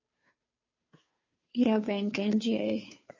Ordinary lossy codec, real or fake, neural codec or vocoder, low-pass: MP3, 32 kbps; fake; codec, 16 kHz, 2 kbps, FunCodec, trained on Chinese and English, 25 frames a second; 7.2 kHz